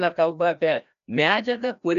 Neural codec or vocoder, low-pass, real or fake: codec, 16 kHz, 1 kbps, FreqCodec, larger model; 7.2 kHz; fake